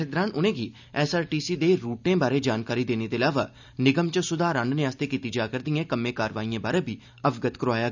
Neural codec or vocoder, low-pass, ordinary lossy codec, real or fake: none; 7.2 kHz; none; real